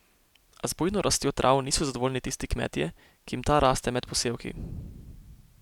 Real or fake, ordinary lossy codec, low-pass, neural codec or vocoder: real; none; 19.8 kHz; none